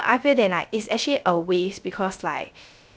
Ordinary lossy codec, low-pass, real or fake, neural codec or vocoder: none; none; fake; codec, 16 kHz, 0.3 kbps, FocalCodec